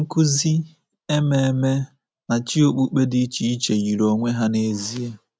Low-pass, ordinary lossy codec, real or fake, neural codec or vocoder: none; none; real; none